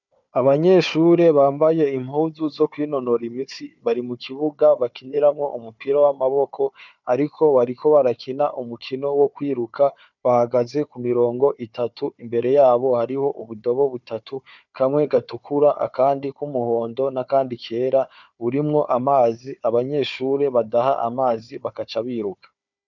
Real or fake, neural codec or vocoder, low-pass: fake; codec, 16 kHz, 4 kbps, FunCodec, trained on Chinese and English, 50 frames a second; 7.2 kHz